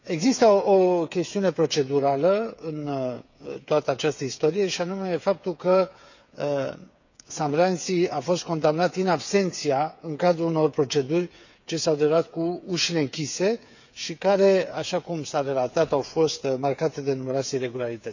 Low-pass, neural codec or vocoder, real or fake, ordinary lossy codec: 7.2 kHz; codec, 16 kHz, 8 kbps, FreqCodec, smaller model; fake; none